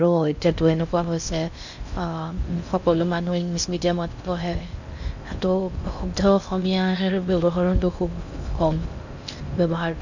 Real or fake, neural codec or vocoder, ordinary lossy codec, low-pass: fake; codec, 16 kHz in and 24 kHz out, 0.6 kbps, FocalCodec, streaming, 4096 codes; none; 7.2 kHz